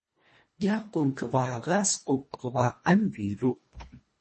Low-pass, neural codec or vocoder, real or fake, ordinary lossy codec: 10.8 kHz; codec, 24 kHz, 1.5 kbps, HILCodec; fake; MP3, 32 kbps